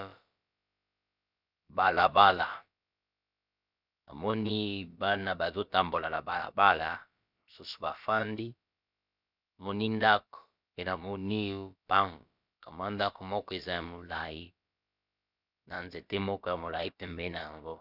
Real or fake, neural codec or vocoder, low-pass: fake; codec, 16 kHz, about 1 kbps, DyCAST, with the encoder's durations; 5.4 kHz